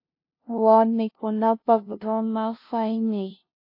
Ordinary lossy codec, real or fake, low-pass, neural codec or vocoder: AAC, 32 kbps; fake; 5.4 kHz; codec, 16 kHz, 0.5 kbps, FunCodec, trained on LibriTTS, 25 frames a second